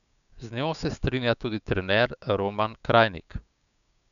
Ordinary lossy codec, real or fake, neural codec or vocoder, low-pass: none; fake; codec, 16 kHz, 6 kbps, DAC; 7.2 kHz